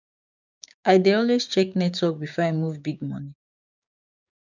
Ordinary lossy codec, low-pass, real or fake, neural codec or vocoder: none; 7.2 kHz; fake; codec, 16 kHz, 6 kbps, DAC